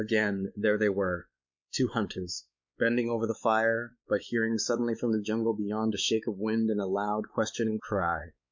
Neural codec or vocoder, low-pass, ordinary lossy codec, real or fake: codec, 16 kHz, 4 kbps, X-Codec, WavLM features, trained on Multilingual LibriSpeech; 7.2 kHz; MP3, 64 kbps; fake